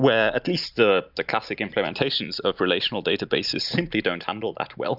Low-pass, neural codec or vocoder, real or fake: 5.4 kHz; none; real